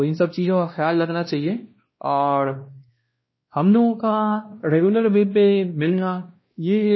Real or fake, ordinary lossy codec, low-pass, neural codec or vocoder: fake; MP3, 24 kbps; 7.2 kHz; codec, 16 kHz, 1 kbps, X-Codec, WavLM features, trained on Multilingual LibriSpeech